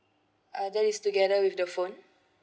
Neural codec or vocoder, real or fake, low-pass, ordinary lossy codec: none; real; none; none